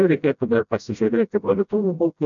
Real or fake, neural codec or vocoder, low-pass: fake; codec, 16 kHz, 0.5 kbps, FreqCodec, smaller model; 7.2 kHz